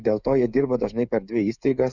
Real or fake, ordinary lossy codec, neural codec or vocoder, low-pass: fake; Opus, 64 kbps; codec, 16 kHz, 16 kbps, FreqCodec, smaller model; 7.2 kHz